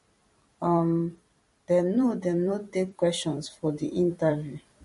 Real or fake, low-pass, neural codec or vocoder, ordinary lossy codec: fake; 14.4 kHz; vocoder, 44.1 kHz, 128 mel bands every 256 samples, BigVGAN v2; MP3, 48 kbps